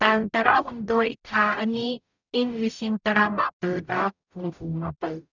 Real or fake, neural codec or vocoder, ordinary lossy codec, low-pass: fake; codec, 44.1 kHz, 0.9 kbps, DAC; none; 7.2 kHz